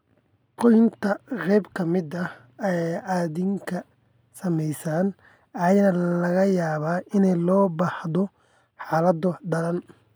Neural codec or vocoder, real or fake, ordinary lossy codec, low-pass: none; real; none; none